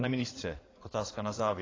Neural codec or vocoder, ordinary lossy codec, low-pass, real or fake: codec, 16 kHz in and 24 kHz out, 2.2 kbps, FireRedTTS-2 codec; AAC, 32 kbps; 7.2 kHz; fake